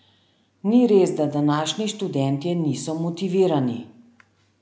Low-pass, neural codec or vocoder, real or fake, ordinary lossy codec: none; none; real; none